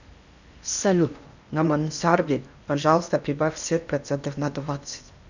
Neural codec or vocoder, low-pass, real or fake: codec, 16 kHz in and 24 kHz out, 0.8 kbps, FocalCodec, streaming, 65536 codes; 7.2 kHz; fake